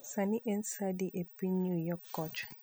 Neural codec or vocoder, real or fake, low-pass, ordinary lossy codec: none; real; none; none